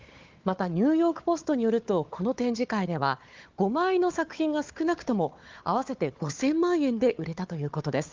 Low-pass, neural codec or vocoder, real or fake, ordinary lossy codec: 7.2 kHz; codec, 16 kHz, 4 kbps, FunCodec, trained on Chinese and English, 50 frames a second; fake; Opus, 32 kbps